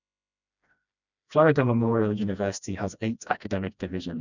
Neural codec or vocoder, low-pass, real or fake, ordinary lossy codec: codec, 16 kHz, 1 kbps, FreqCodec, smaller model; 7.2 kHz; fake; none